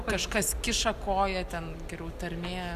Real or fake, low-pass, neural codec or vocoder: real; 14.4 kHz; none